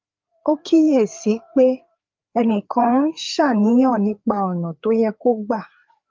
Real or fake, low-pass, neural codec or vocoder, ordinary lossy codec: fake; 7.2 kHz; codec, 16 kHz, 4 kbps, FreqCodec, larger model; Opus, 24 kbps